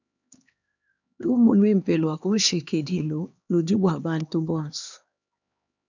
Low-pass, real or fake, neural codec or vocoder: 7.2 kHz; fake; codec, 16 kHz, 2 kbps, X-Codec, HuBERT features, trained on LibriSpeech